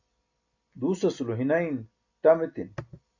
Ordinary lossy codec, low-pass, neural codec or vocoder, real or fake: AAC, 48 kbps; 7.2 kHz; none; real